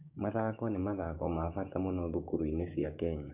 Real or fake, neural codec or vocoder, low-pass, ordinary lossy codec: fake; codec, 16 kHz, 6 kbps, DAC; 3.6 kHz; none